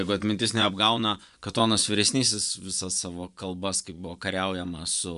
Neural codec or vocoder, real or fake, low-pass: vocoder, 24 kHz, 100 mel bands, Vocos; fake; 10.8 kHz